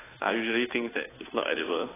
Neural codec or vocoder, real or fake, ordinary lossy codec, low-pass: codec, 16 kHz, 2 kbps, FunCodec, trained on Chinese and English, 25 frames a second; fake; AAC, 16 kbps; 3.6 kHz